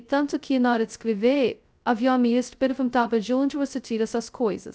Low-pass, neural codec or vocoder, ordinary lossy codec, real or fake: none; codec, 16 kHz, 0.2 kbps, FocalCodec; none; fake